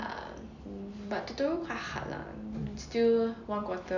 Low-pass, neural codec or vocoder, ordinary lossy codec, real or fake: 7.2 kHz; none; none; real